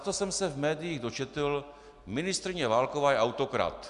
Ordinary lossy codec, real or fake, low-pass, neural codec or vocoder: Opus, 64 kbps; real; 10.8 kHz; none